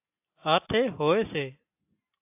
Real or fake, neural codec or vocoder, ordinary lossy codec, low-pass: real; none; AAC, 32 kbps; 3.6 kHz